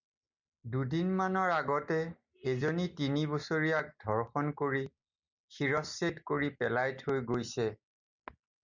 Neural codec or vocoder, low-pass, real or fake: none; 7.2 kHz; real